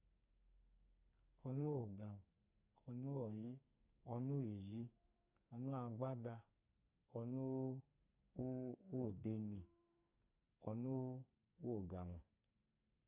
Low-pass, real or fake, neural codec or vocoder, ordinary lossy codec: 3.6 kHz; fake; codec, 44.1 kHz, 2.6 kbps, SNAC; Opus, 64 kbps